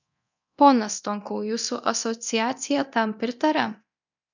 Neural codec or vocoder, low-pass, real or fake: codec, 24 kHz, 0.9 kbps, DualCodec; 7.2 kHz; fake